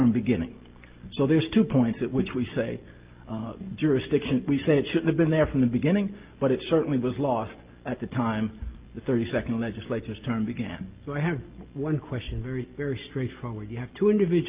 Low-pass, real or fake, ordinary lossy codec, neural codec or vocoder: 3.6 kHz; real; Opus, 24 kbps; none